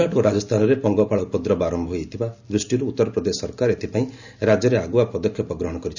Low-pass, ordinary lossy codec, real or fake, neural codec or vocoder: 7.2 kHz; none; real; none